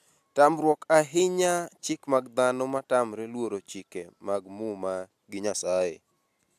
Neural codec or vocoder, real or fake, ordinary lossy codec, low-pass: none; real; AAC, 96 kbps; 14.4 kHz